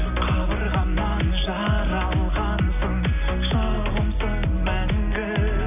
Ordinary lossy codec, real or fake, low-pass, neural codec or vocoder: none; real; 3.6 kHz; none